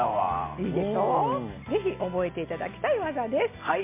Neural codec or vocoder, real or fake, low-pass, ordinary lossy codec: none; real; 3.6 kHz; MP3, 24 kbps